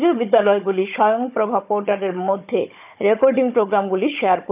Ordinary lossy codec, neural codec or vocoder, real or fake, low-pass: none; codec, 16 kHz, 16 kbps, FunCodec, trained on Chinese and English, 50 frames a second; fake; 3.6 kHz